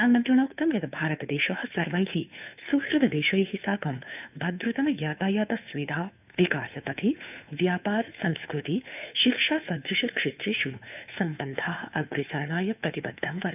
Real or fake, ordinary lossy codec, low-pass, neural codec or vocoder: fake; none; 3.6 kHz; codec, 16 kHz, 2 kbps, FunCodec, trained on Chinese and English, 25 frames a second